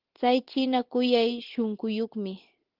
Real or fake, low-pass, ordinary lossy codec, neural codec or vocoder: real; 5.4 kHz; Opus, 16 kbps; none